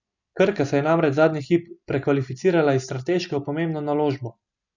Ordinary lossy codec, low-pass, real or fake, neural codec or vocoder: none; 7.2 kHz; real; none